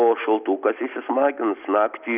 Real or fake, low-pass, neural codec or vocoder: real; 3.6 kHz; none